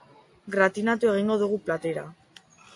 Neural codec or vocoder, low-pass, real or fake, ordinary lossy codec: none; 10.8 kHz; real; AAC, 48 kbps